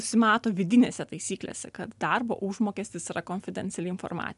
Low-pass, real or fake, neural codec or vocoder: 10.8 kHz; real; none